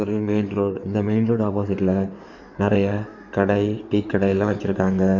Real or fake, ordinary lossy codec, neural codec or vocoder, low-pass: fake; none; codec, 16 kHz in and 24 kHz out, 2.2 kbps, FireRedTTS-2 codec; 7.2 kHz